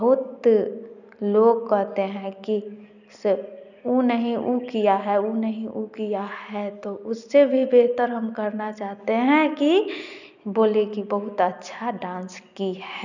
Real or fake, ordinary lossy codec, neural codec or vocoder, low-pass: real; none; none; 7.2 kHz